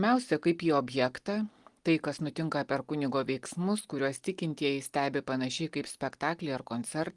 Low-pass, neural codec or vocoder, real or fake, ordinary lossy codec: 10.8 kHz; none; real; Opus, 24 kbps